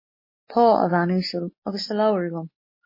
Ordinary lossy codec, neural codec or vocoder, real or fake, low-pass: MP3, 24 kbps; none; real; 5.4 kHz